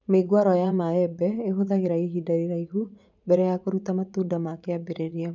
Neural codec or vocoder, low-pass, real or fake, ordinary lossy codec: vocoder, 44.1 kHz, 80 mel bands, Vocos; 7.2 kHz; fake; none